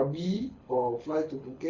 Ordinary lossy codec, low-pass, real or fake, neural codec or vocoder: Opus, 64 kbps; 7.2 kHz; fake; codec, 24 kHz, 6 kbps, HILCodec